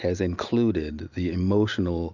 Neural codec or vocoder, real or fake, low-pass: none; real; 7.2 kHz